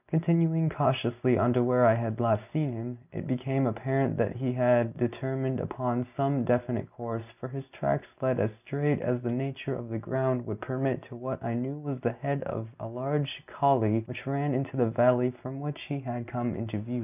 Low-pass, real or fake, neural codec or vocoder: 3.6 kHz; real; none